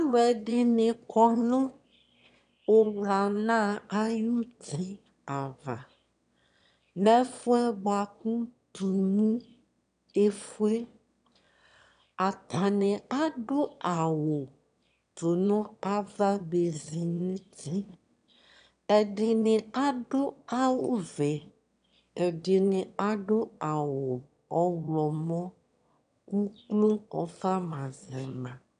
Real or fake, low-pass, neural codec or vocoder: fake; 9.9 kHz; autoencoder, 22.05 kHz, a latent of 192 numbers a frame, VITS, trained on one speaker